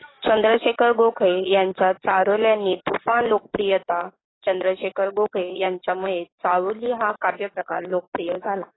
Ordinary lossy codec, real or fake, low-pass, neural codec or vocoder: AAC, 16 kbps; fake; 7.2 kHz; codec, 44.1 kHz, 7.8 kbps, DAC